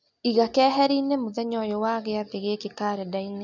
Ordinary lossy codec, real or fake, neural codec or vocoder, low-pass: none; real; none; 7.2 kHz